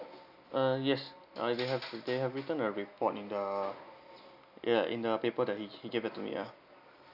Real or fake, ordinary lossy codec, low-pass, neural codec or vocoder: real; none; 5.4 kHz; none